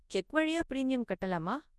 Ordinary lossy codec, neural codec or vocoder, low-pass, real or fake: none; codec, 24 kHz, 0.9 kbps, WavTokenizer, large speech release; none; fake